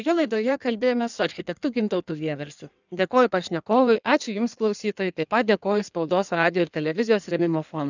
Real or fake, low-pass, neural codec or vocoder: fake; 7.2 kHz; codec, 16 kHz in and 24 kHz out, 1.1 kbps, FireRedTTS-2 codec